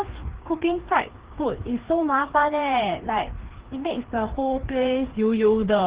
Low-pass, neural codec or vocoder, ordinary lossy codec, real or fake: 3.6 kHz; codec, 16 kHz, 2 kbps, FreqCodec, larger model; Opus, 16 kbps; fake